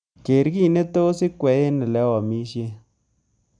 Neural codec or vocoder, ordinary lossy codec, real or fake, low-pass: none; none; real; 9.9 kHz